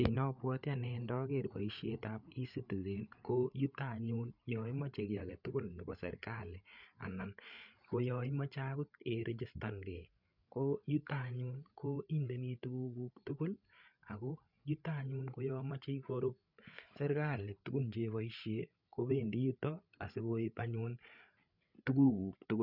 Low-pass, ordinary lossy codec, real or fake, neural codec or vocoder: 5.4 kHz; none; fake; codec, 16 kHz, 8 kbps, FreqCodec, larger model